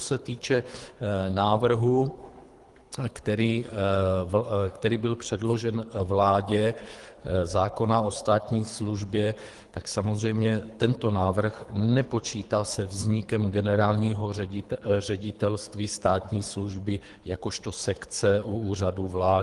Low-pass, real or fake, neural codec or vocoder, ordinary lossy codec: 10.8 kHz; fake; codec, 24 kHz, 3 kbps, HILCodec; Opus, 24 kbps